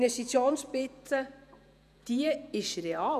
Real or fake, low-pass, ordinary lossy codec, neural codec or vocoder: fake; 14.4 kHz; none; vocoder, 48 kHz, 128 mel bands, Vocos